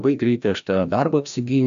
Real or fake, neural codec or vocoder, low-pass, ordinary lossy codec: fake; codec, 16 kHz, 1 kbps, FreqCodec, larger model; 7.2 kHz; AAC, 96 kbps